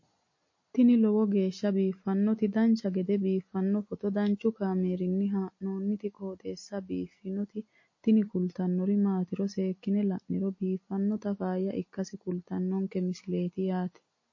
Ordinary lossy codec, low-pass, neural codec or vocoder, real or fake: MP3, 32 kbps; 7.2 kHz; none; real